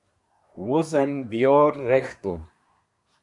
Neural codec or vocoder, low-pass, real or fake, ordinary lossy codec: codec, 24 kHz, 1 kbps, SNAC; 10.8 kHz; fake; AAC, 64 kbps